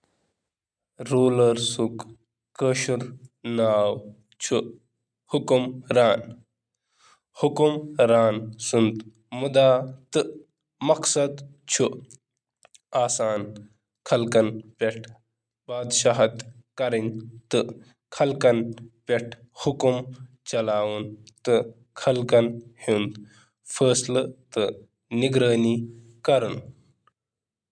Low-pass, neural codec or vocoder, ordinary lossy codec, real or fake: none; none; none; real